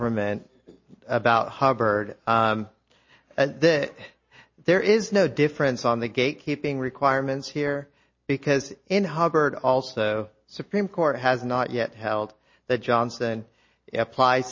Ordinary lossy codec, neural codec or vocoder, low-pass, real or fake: MP3, 32 kbps; none; 7.2 kHz; real